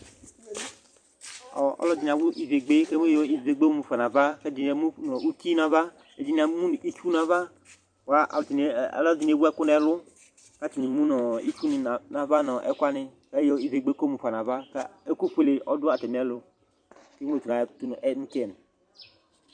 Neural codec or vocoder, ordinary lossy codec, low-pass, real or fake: vocoder, 44.1 kHz, 128 mel bands every 256 samples, BigVGAN v2; MP3, 64 kbps; 9.9 kHz; fake